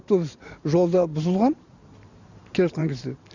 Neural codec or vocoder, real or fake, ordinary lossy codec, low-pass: vocoder, 44.1 kHz, 128 mel bands every 512 samples, BigVGAN v2; fake; none; 7.2 kHz